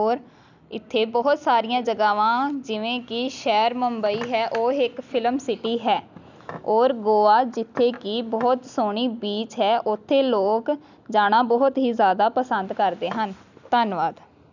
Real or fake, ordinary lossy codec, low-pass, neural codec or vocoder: real; none; 7.2 kHz; none